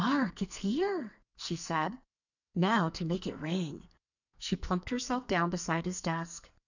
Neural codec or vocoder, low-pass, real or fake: codec, 44.1 kHz, 2.6 kbps, SNAC; 7.2 kHz; fake